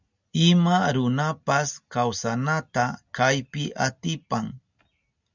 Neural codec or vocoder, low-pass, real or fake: none; 7.2 kHz; real